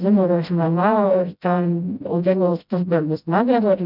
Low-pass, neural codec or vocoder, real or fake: 5.4 kHz; codec, 16 kHz, 0.5 kbps, FreqCodec, smaller model; fake